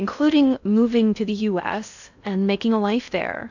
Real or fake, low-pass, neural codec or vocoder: fake; 7.2 kHz; codec, 16 kHz in and 24 kHz out, 0.6 kbps, FocalCodec, streaming, 4096 codes